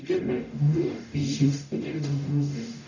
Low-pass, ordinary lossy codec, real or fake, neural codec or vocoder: 7.2 kHz; none; fake; codec, 44.1 kHz, 0.9 kbps, DAC